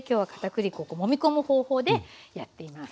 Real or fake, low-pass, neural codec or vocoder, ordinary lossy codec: real; none; none; none